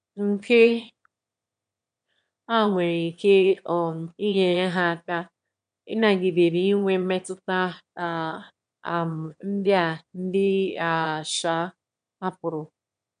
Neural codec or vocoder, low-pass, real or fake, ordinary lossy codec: autoencoder, 22.05 kHz, a latent of 192 numbers a frame, VITS, trained on one speaker; 9.9 kHz; fake; MP3, 64 kbps